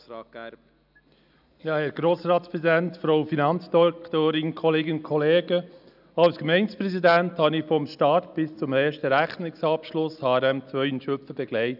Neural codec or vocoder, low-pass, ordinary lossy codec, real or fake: none; 5.4 kHz; none; real